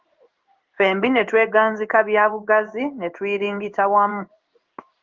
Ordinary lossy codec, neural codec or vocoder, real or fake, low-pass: Opus, 32 kbps; codec, 16 kHz in and 24 kHz out, 1 kbps, XY-Tokenizer; fake; 7.2 kHz